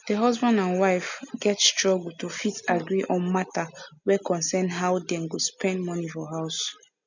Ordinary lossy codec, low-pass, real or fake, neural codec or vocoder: none; 7.2 kHz; real; none